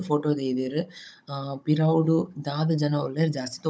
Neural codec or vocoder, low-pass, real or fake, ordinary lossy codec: codec, 16 kHz, 16 kbps, FunCodec, trained on Chinese and English, 50 frames a second; none; fake; none